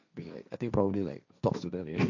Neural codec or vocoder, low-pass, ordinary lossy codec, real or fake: codec, 16 kHz, 1.1 kbps, Voila-Tokenizer; 7.2 kHz; none; fake